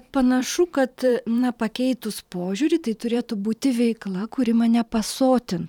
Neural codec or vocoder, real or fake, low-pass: vocoder, 44.1 kHz, 128 mel bands every 512 samples, BigVGAN v2; fake; 19.8 kHz